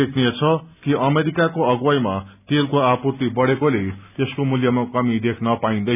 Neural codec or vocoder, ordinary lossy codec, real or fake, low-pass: none; none; real; 3.6 kHz